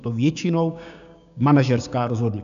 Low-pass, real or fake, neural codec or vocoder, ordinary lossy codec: 7.2 kHz; fake; codec, 16 kHz, 6 kbps, DAC; MP3, 96 kbps